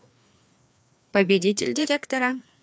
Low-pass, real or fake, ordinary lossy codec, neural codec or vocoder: none; fake; none; codec, 16 kHz, 2 kbps, FreqCodec, larger model